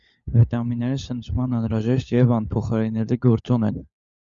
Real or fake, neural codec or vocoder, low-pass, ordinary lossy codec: fake; codec, 16 kHz, 16 kbps, FunCodec, trained on LibriTTS, 50 frames a second; 7.2 kHz; Opus, 64 kbps